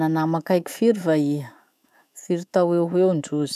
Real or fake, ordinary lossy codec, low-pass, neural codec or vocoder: fake; none; 14.4 kHz; autoencoder, 48 kHz, 128 numbers a frame, DAC-VAE, trained on Japanese speech